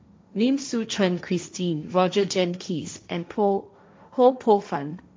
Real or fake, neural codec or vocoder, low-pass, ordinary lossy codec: fake; codec, 16 kHz, 1.1 kbps, Voila-Tokenizer; none; none